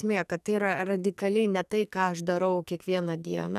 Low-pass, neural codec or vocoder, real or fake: 14.4 kHz; codec, 44.1 kHz, 2.6 kbps, SNAC; fake